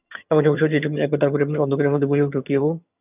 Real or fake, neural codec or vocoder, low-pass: fake; vocoder, 22.05 kHz, 80 mel bands, HiFi-GAN; 3.6 kHz